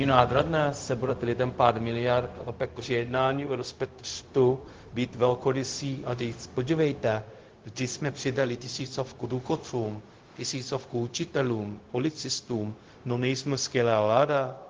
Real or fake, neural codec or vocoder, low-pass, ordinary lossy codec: fake; codec, 16 kHz, 0.4 kbps, LongCat-Audio-Codec; 7.2 kHz; Opus, 16 kbps